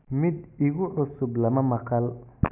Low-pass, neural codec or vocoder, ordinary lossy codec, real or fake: 3.6 kHz; none; none; real